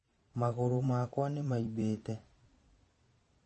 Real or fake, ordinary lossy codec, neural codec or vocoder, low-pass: fake; MP3, 32 kbps; vocoder, 22.05 kHz, 80 mel bands, Vocos; 9.9 kHz